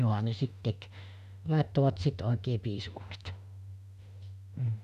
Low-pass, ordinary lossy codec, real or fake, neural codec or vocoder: 14.4 kHz; none; fake; autoencoder, 48 kHz, 32 numbers a frame, DAC-VAE, trained on Japanese speech